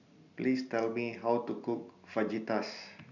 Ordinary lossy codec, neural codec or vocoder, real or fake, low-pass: none; none; real; 7.2 kHz